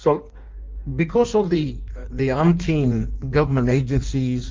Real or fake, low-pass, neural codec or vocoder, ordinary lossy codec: fake; 7.2 kHz; codec, 16 kHz in and 24 kHz out, 1.1 kbps, FireRedTTS-2 codec; Opus, 24 kbps